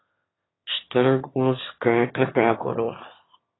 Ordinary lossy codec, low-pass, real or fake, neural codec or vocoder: AAC, 16 kbps; 7.2 kHz; fake; autoencoder, 22.05 kHz, a latent of 192 numbers a frame, VITS, trained on one speaker